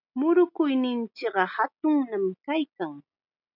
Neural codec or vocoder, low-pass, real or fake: none; 5.4 kHz; real